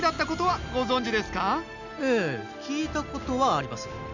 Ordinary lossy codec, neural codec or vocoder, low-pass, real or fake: none; none; 7.2 kHz; real